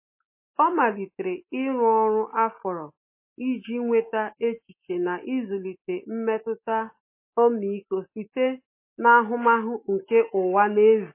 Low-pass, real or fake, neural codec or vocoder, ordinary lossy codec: 3.6 kHz; real; none; MP3, 24 kbps